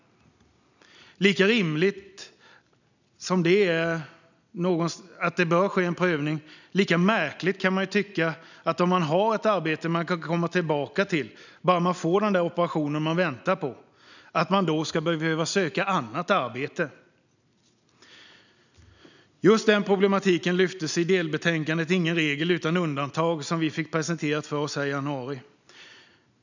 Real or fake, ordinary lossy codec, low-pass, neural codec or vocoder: real; none; 7.2 kHz; none